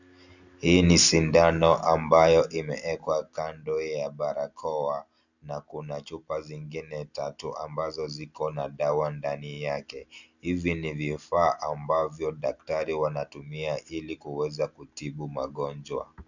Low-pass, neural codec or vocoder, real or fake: 7.2 kHz; none; real